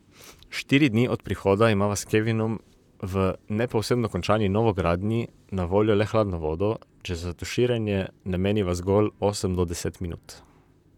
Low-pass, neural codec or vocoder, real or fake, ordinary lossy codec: 19.8 kHz; vocoder, 44.1 kHz, 128 mel bands, Pupu-Vocoder; fake; none